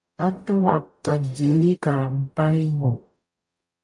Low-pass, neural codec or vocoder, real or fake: 10.8 kHz; codec, 44.1 kHz, 0.9 kbps, DAC; fake